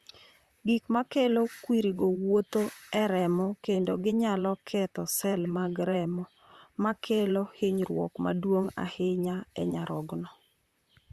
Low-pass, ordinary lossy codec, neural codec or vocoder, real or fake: 14.4 kHz; Opus, 64 kbps; vocoder, 44.1 kHz, 128 mel bands, Pupu-Vocoder; fake